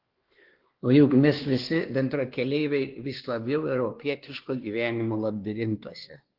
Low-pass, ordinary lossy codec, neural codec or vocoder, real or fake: 5.4 kHz; Opus, 16 kbps; codec, 16 kHz, 2 kbps, X-Codec, WavLM features, trained on Multilingual LibriSpeech; fake